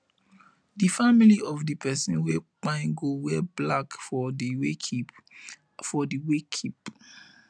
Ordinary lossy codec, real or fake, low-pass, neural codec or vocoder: none; real; 9.9 kHz; none